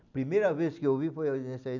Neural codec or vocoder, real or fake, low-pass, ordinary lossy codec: none; real; 7.2 kHz; none